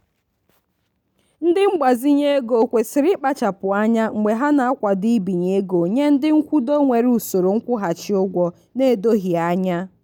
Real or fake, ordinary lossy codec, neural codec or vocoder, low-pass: real; none; none; 19.8 kHz